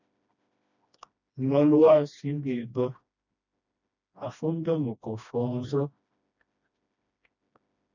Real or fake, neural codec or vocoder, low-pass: fake; codec, 16 kHz, 1 kbps, FreqCodec, smaller model; 7.2 kHz